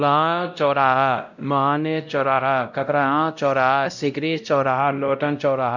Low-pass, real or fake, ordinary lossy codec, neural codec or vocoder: 7.2 kHz; fake; none; codec, 16 kHz, 0.5 kbps, X-Codec, WavLM features, trained on Multilingual LibriSpeech